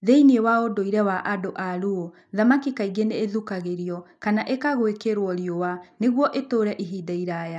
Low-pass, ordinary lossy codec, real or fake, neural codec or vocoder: none; none; real; none